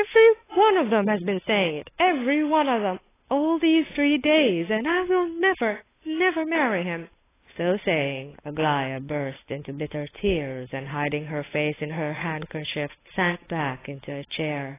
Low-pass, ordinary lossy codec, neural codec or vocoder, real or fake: 3.6 kHz; AAC, 16 kbps; codec, 16 kHz, 8 kbps, FunCodec, trained on Chinese and English, 25 frames a second; fake